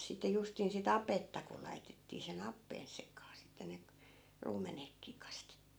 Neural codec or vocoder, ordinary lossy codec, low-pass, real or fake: none; none; none; real